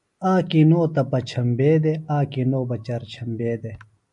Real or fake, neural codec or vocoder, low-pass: real; none; 10.8 kHz